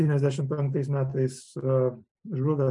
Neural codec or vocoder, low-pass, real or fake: none; 10.8 kHz; real